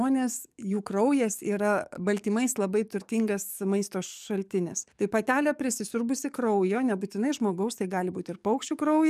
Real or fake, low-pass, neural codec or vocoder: fake; 14.4 kHz; codec, 44.1 kHz, 7.8 kbps, DAC